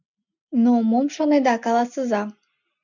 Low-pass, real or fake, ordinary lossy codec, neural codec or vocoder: 7.2 kHz; real; MP3, 48 kbps; none